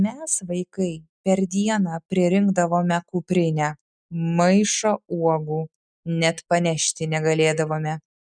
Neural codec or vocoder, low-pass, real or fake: none; 9.9 kHz; real